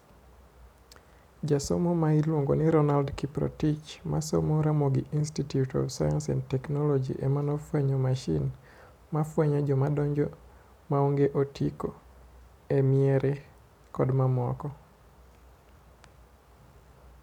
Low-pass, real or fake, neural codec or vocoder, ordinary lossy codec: 19.8 kHz; real; none; none